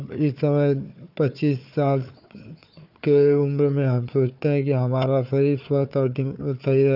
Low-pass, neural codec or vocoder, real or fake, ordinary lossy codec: 5.4 kHz; codec, 16 kHz, 4 kbps, FunCodec, trained on Chinese and English, 50 frames a second; fake; none